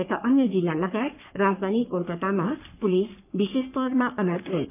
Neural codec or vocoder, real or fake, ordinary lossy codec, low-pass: codec, 44.1 kHz, 3.4 kbps, Pupu-Codec; fake; none; 3.6 kHz